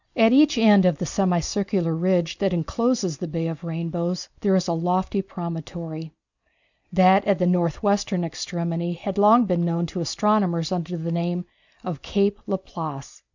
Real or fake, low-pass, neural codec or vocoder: real; 7.2 kHz; none